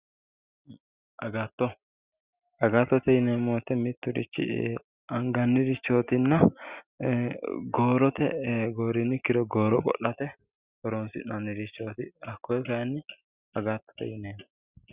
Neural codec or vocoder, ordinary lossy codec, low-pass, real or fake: none; Opus, 64 kbps; 3.6 kHz; real